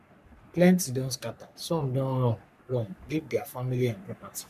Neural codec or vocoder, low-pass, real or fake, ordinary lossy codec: codec, 44.1 kHz, 3.4 kbps, Pupu-Codec; 14.4 kHz; fake; none